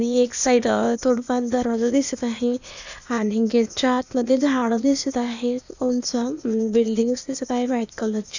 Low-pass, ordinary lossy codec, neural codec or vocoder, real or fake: 7.2 kHz; none; codec, 16 kHz, 0.8 kbps, ZipCodec; fake